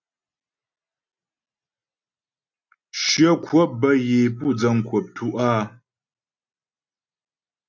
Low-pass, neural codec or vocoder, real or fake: 7.2 kHz; none; real